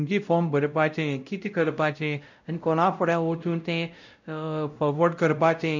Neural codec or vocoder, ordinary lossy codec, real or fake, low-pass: codec, 16 kHz, 0.5 kbps, X-Codec, WavLM features, trained on Multilingual LibriSpeech; none; fake; 7.2 kHz